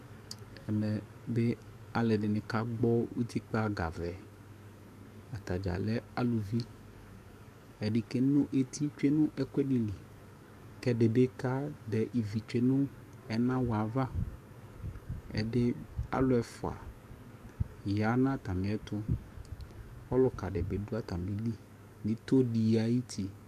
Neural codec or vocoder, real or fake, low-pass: codec, 44.1 kHz, 7.8 kbps, DAC; fake; 14.4 kHz